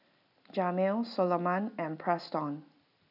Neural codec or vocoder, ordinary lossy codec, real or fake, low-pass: none; none; real; 5.4 kHz